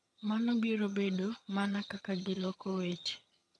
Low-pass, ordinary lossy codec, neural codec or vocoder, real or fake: none; none; vocoder, 22.05 kHz, 80 mel bands, HiFi-GAN; fake